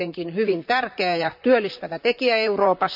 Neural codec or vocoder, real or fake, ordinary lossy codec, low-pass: vocoder, 44.1 kHz, 128 mel bands, Pupu-Vocoder; fake; AAC, 48 kbps; 5.4 kHz